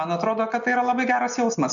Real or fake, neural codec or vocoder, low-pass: real; none; 7.2 kHz